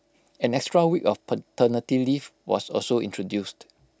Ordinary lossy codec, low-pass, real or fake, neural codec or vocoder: none; none; real; none